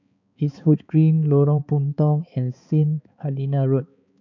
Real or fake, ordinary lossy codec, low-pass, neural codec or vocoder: fake; none; 7.2 kHz; codec, 16 kHz, 4 kbps, X-Codec, HuBERT features, trained on balanced general audio